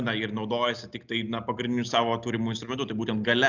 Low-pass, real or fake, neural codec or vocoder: 7.2 kHz; real; none